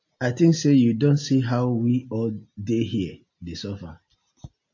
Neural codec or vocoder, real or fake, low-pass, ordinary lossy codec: none; real; 7.2 kHz; AAC, 48 kbps